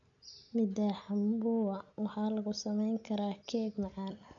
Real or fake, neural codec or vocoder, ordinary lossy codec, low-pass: real; none; none; 7.2 kHz